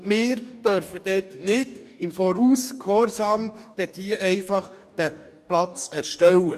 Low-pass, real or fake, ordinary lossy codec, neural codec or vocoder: 14.4 kHz; fake; none; codec, 44.1 kHz, 2.6 kbps, DAC